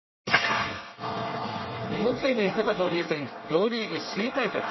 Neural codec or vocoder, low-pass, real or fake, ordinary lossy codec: codec, 24 kHz, 1 kbps, SNAC; 7.2 kHz; fake; MP3, 24 kbps